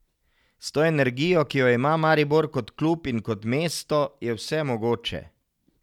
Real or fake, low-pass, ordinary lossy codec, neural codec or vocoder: real; 19.8 kHz; none; none